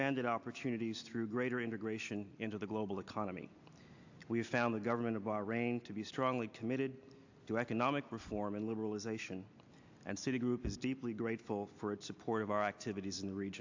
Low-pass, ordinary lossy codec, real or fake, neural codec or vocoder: 7.2 kHz; AAC, 48 kbps; real; none